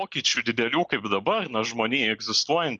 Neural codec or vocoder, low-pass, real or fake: none; 9.9 kHz; real